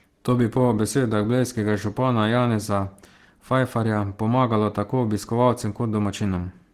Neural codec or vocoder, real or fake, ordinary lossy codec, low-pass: none; real; Opus, 16 kbps; 14.4 kHz